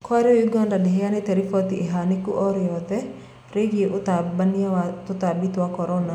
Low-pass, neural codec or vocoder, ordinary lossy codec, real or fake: 19.8 kHz; none; none; real